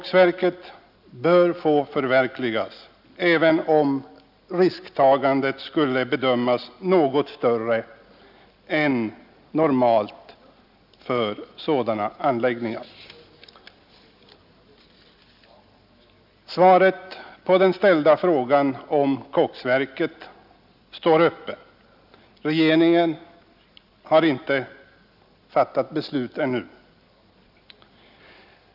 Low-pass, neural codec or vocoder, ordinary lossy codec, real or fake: 5.4 kHz; none; none; real